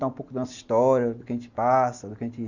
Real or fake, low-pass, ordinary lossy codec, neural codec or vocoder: real; 7.2 kHz; Opus, 64 kbps; none